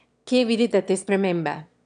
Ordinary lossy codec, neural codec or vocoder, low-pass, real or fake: none; autoencoder, 22.05 kHz, a latent of 192 numbers a frame, VITS, trained on one speaker; 9.9 kHz; fake